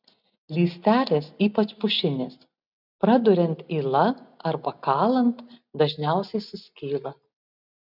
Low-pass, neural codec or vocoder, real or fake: 5.4 kHz; none; real